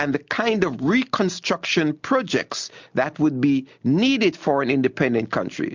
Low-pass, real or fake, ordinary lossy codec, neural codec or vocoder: 7.2 kHz; real; MP3, 64 kbps; none